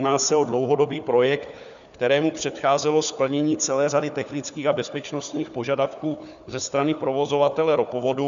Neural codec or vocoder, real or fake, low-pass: codec, 16 kHz, 4 kbps, FunCodec, trained on Chinese and English, 50 frames a second; fake; 7.2 kHz